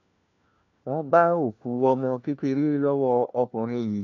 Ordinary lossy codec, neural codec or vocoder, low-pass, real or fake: none; codec, 16 kHz, 1 kbps, FunCodec, trained on LibriTTS, 50 frames a second; 7.2 kHz; fake